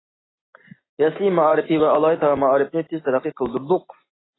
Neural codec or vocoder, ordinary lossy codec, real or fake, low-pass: none; AAC, 16 kbps; real; 7.2 kHz